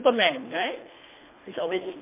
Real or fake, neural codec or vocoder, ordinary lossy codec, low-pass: fake; codec, 24 kHz, 3 kbps, HILCodec; MP3, 24 kbps; 3.6 kHz